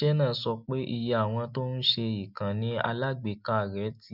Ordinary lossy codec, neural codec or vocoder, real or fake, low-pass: none; none; real; 5.4 kHz